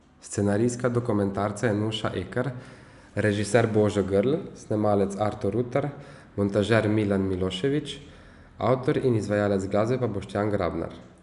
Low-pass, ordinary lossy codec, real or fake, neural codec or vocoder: 10.8 kHz; none; real; none